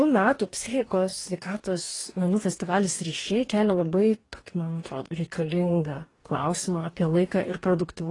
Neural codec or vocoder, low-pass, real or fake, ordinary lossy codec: codec, 44.1 kHz, 2.6 kbps, DAC; 10.8 kHz; fake; AAC, 32 kbps